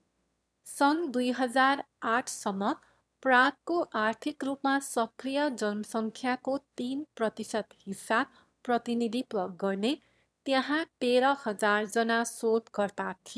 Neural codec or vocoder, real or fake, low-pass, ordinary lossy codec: autoencoder, 22.05 kHz, a latent of 192 numbers a frame, VITS, trained on one speaker; fake; none; none